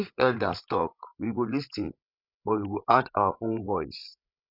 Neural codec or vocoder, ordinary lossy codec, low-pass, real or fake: codec, 16 kHz, 16 kbps, FreqCodec, larger model; none; 5.4 kHz; fake